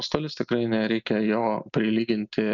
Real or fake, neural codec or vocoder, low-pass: real; none; 7.2 kHz